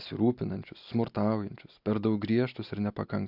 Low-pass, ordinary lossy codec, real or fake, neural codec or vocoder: 5.4 kHz; AAC, 48 kbps; real; none